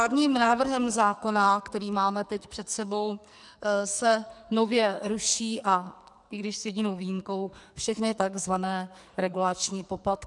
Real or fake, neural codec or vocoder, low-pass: fake; codec, 44.1 kHz, 2.6 kbps, SNAC; 10.8 kHz